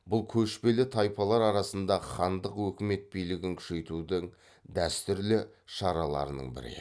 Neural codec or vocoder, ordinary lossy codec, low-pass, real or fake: none; none; none; real